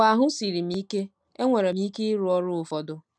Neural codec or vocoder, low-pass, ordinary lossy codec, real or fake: none; none; none; real